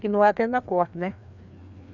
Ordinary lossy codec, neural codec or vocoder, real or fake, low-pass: none; codec, 16 kHz, 2 kbps, FreqCodec, larger model; fake; 7.2 kHz